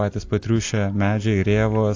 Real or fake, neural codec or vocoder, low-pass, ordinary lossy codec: real; none; 7.2 kHz; AAC, 48 kbps